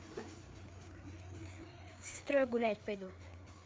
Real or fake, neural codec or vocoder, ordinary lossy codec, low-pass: fake; codec, 16 kHz, 4 kbps, FreqCodec, larger model; none; none